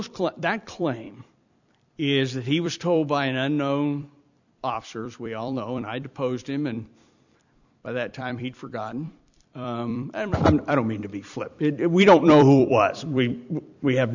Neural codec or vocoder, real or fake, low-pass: vocoder, 44.1 kHz, 128 mel bands every 256 samples, BigVGAN v2; fake; 7.2 kHz